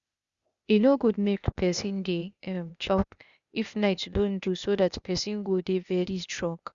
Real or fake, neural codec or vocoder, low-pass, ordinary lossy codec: fake; codec, 16 kHz, 0.8 kbps, ZipCodec; 7.2 kHz; Opus, 64 kbps